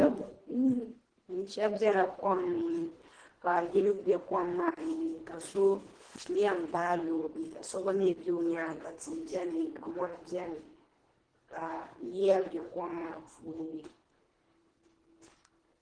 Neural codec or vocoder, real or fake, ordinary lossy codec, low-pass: codec, 24 kHz, 1.5 kbps, HILCodec; fake; Opus, 16 kbps; 9.9 kHz